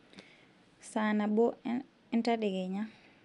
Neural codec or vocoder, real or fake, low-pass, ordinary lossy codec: none; real; 10.8 kHz; none